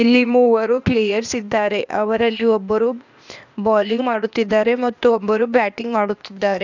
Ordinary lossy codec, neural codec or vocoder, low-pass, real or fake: none; codec, 16 kHz, 0.8 kbps, ZipCodec; 7.2 kHz; fake